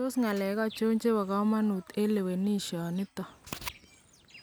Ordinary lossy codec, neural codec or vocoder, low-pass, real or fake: none; none; none; real